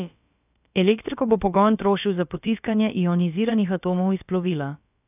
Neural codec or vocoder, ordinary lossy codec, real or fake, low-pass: codec, 16 kHz, about 1 kbps, DyCAST, with the encoder's durations; none; fake; 3.6 kHz